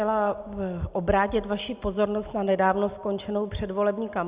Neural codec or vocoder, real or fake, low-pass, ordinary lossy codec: none; real; 3.6 kHz; Opus, 64 kbps